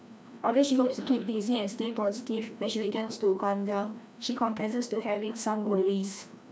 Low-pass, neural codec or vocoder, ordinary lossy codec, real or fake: none; codec, 16 kHz, 1 kbps, FreqCodec, larger model; none; fake